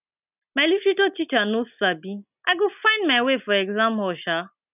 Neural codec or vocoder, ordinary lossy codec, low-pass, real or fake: none; none; 3.6 kHz; real